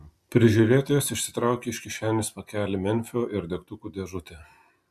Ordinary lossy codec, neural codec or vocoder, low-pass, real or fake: MP3, 96 kbps; none; 14.4 kHz; real